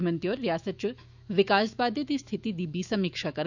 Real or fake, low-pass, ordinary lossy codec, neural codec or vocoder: fake; 7.2 kHz; none; autoencoder, 48 kHz, 128 numbers a frame, DAC-VAE, trained on Japanese speech